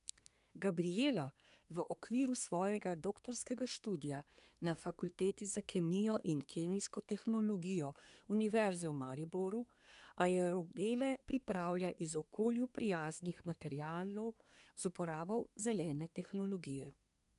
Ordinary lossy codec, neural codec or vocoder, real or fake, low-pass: none; codec, 24 kHz, 1 kbps, SNAC; fake; 10.8 kHz